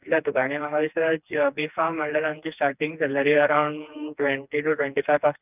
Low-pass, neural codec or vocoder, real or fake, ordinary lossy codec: 3.6 kHz; codec, 16 kHz, 2 kbps, FreqCodec, smaller model; fake; none